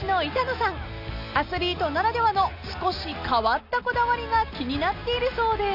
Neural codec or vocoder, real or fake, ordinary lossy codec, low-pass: none; real; none; 5.4 kHz